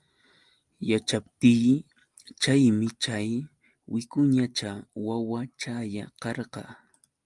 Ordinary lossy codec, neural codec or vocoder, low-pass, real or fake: Opus, 32 kbps; none; 10.8 kHz; real